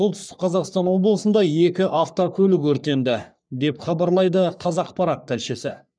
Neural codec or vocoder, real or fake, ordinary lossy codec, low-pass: codec, 44.1 kHz, 3.4 kbps, Pupu-Codec; fake; none; 9.9 kHz